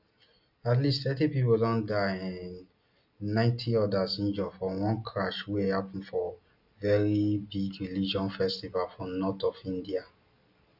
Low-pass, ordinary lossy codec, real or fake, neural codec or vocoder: 5.4 kHz; none; real; none